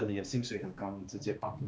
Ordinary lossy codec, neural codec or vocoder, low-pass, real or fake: none; codec, 16 kHz, 2 kbps, X-Codec, HuBERT features, trained on balanced general audio; none; fake